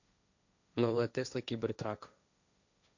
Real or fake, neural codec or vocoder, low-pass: fake; codec, 16 kHz, 1.1 kbps, Voila-Tokenizer; 7.2 kHz